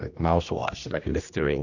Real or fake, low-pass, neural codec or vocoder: fake; 7.2 kHz; codec, 16 kHz, 1 kbps, X-Codec, HuBERT features, trained on general audio